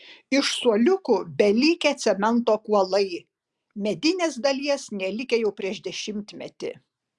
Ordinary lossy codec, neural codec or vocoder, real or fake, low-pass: Opus, 64 kbps; none; real; 10.8 kHz